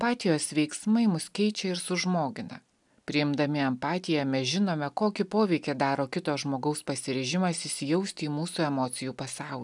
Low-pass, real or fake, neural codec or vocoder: 10.8 kHz; real; none